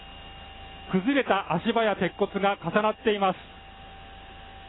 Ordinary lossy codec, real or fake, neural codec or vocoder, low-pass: AAC, 16 kbps; real; none; 7.2 kHz